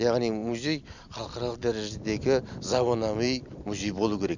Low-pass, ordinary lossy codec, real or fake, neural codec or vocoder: 7.2 kHz; none; real; none